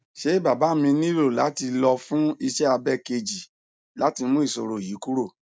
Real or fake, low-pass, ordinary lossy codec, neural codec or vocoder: real; none; none; none